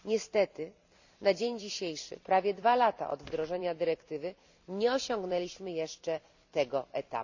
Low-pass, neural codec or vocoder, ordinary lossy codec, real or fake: 7.2 kHz; vocoder, 44.1 kHz, 128 mel bands every 256 samples, BigVGAN v2; none; fake